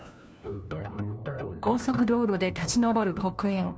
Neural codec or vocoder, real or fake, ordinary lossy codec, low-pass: codec, 16 kHz, 1 kbps, FunCodec, trained on LibriTTS, 50 frames a second; fake; none; none